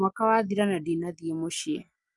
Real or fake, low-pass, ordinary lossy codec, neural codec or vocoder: real; 10.8 kHz; Opus, 32 kbps; none